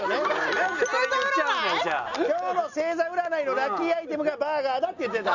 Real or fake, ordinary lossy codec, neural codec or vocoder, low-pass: real; none; none; 7.2 kHz